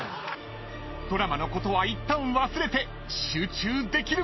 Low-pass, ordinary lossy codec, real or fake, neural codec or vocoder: 7.2 kHz; MP3, 24 kbps; real; none